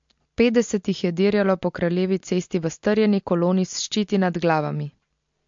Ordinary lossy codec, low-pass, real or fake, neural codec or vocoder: MP3, 48 kbps; 7.2 kHz; real; none